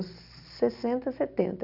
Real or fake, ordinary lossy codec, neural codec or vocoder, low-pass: real; none; none; 5.4 kHz